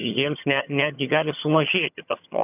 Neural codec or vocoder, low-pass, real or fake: vocoder, 22.05 kHz, 80 mel bands, HiFi-GAN; 3.6 kHz; fake